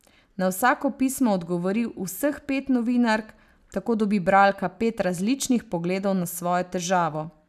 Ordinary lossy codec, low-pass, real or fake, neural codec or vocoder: none; 14.4 kHz; real; none